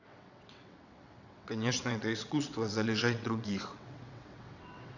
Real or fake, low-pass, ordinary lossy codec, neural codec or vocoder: fake; 7.2 kHz; none; vocoder, 22.05 kHz, 80 mel bands, WaveNeXt